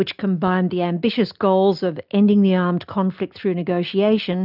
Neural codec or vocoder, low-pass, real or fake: none; 5.4 kHz; real